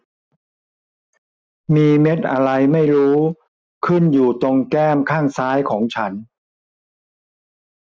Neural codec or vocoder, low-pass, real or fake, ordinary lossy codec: none; none; real; none